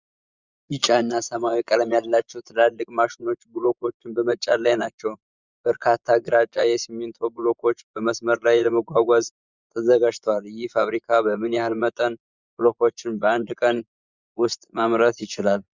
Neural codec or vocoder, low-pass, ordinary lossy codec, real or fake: vocoder, 24 kHz, 100 mel bands, Vocos; 7.2 kHz; Opus, 64 kbps; fake